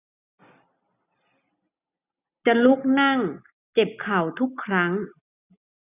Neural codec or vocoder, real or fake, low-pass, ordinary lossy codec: none; real; 3.6 kHz; none